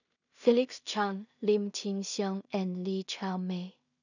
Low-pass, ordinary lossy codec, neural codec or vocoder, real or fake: 7.2 kHz; none; codec, 16 kHz in and 24 kHz out, 0.4 kbps, LongCat-Audio-Codec, two codebook decoder; fake